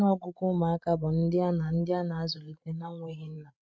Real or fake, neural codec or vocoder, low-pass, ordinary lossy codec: fake; codec, 16 kHz, 16 kbps, FreqCodec, larger model; none; none